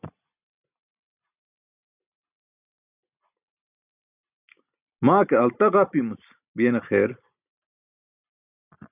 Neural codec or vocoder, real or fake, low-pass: none; real; 3.6 kHz